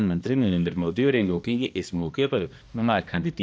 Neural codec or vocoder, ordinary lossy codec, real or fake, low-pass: codec, 16 kHz, 1 kbps, X-Codec, HuBERT features, trained on balanced general audio; none; fake; none